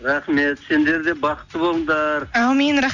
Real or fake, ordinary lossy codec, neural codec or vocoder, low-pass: real; none; none; 7.2 kHz